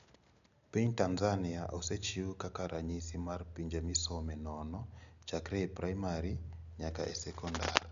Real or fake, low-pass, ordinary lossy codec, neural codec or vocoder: real; 7.2 kHz; none; none